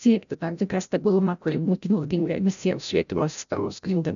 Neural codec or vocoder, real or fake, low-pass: codec, 16 kHz, 0.5 kbps, FreqCodec, larger model; fake; 7.2 kHz